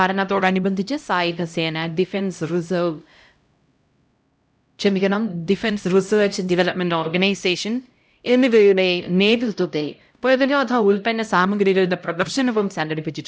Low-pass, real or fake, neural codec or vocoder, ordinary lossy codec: none; fake; codec, 16 kHz, 0.5 kbps, X-Codec, HuBERT features, trained on LibriSpeech; none